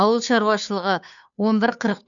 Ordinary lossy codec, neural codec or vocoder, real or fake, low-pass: none; codec, 16 kHz, 2 kbps, FunCodec, trained on Chinese and English, 25 frames a second; fake; 7.2 kHz